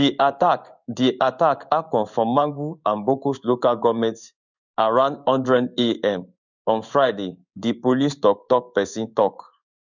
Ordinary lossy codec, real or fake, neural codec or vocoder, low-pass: none; fake; codec, 16 kHz in and 24 kHz out, 1 kbps, XY-Tokenizer; 7.2 kHz